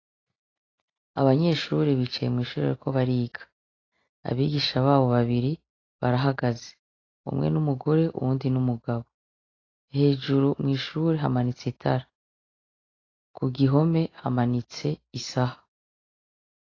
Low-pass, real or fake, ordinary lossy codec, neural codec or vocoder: 7.2 kHz; real; AAC, 32 kbps; none